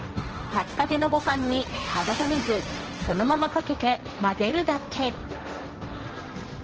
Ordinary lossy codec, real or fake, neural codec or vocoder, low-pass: Opus, 16 kbps; fake; codec, 16 kHz, 1.1 kbps, Voila-Tokenizer; 7.2 kHz